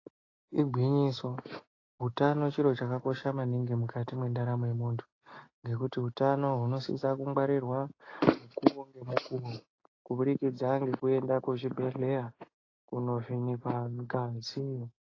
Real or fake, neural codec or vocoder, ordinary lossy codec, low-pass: real; none; AAC, 32 kbps; 7.2 kHz